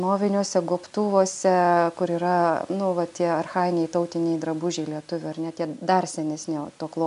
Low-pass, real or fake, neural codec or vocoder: 10.8 kHz; real; none